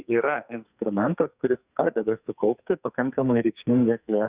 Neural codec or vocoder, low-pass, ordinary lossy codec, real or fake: codec, 44.1 kHz, 2.6 kbps, SNAC; 3.6 kHz; Opus, 64 kbps; fake